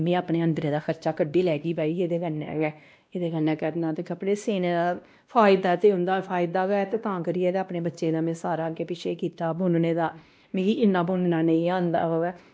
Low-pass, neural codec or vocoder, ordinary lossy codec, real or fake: none; codec, 16 kHz, 1 kbps, X-Codec, WavLM features, trained on Multilingual LibriSpeech; none; fake